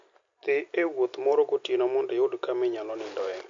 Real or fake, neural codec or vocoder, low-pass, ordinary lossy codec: real; none; 7.2 kHz; MP3, 48 kbps